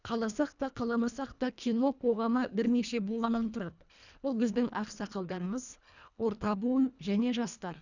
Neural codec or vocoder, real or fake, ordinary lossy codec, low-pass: codec, 24 kHz, 1.5 kbps, HILCodec; fake; none; 7.2 kHz